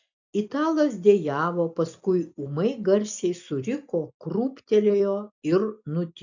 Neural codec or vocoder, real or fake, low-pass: none; real; 7.2 kHz